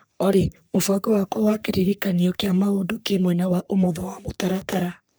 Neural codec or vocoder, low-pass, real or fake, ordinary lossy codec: codec, 44.1 kHz, 3.4 kbps, Pupu-Codec; none; fake; none